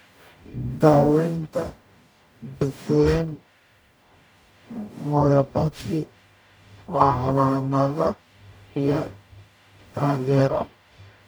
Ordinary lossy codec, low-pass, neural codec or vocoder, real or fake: none; none; codec, 44.1 kHz, 0.9 kbps, DAC; fake